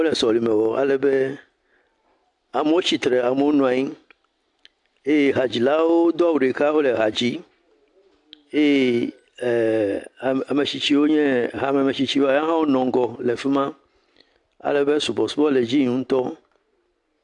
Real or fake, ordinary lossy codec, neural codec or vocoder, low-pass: real; AAC, 64 kbps; none; 10.8 kHz